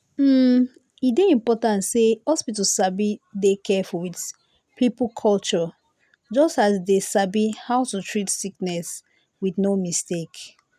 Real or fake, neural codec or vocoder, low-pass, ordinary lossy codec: real; none; 14.4 kHz; none